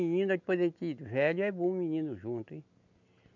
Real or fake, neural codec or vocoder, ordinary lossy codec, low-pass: real; none; none; 7.2 kHz